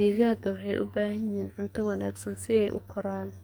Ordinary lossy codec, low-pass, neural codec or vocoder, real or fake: none; none; codec, 44.1 kHz, 2.6 kbps, SNAC; fake